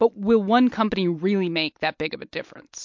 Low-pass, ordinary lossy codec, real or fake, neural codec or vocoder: 7.2 kHz; MP3, 48 kbps; real; none